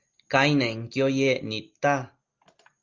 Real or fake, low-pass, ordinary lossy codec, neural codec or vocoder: real; 7.2 kHz; Opus, 32 kbps; none